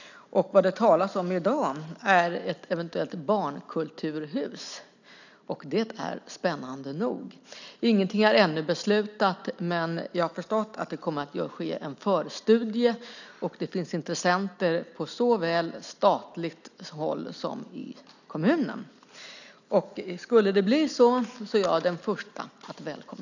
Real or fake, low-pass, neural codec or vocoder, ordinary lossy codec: real; 7.2 kHz; none; none